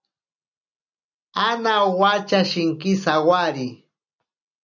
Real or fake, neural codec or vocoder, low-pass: real; none; 7.2 kHz